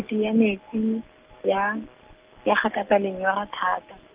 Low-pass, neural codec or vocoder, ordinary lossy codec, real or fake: 3.6 kHz; none; Opus, 24 kbps; real